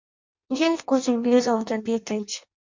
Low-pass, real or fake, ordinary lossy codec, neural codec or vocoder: 7.2 kHz; fake; MP3, 64 kbps; codec, 16 kHz in and 24 kHz out, 0.6 kbps, FireRedTTS-2 codec